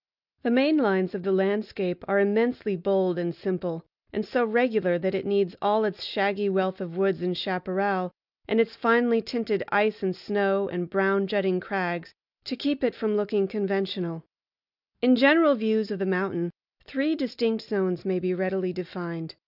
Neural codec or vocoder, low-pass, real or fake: none; 5.4 kHz; real